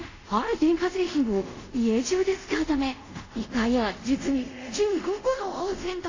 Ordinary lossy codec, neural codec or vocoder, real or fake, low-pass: AAC, 48 kbps; codec, 24 kHz, 0.5 kbps, DualCodec; fake; 7.2 kHz